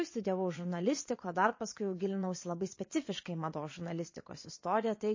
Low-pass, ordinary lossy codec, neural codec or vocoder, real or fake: 7.2 kHz; MP3, 32 kbps; vocoder, 22.05 kHz, 80 mel bands, Vocos; fake